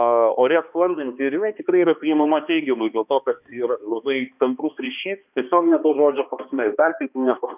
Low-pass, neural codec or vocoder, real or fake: 3.6 kHz; codec, 16 kHz, 2 kbps, X-Codec, HuBERT features, trained on balanced general audio; fake